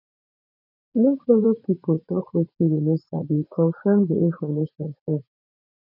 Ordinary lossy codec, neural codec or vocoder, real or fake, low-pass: none; vocoder, 44.1 kHz, 80 mel bands, Vocos; fake; 5.4 kHz